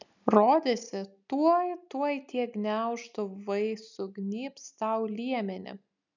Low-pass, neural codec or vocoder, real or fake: 7.2 kHz; none; real